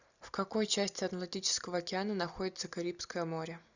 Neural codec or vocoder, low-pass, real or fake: none; 7.2 kHz; real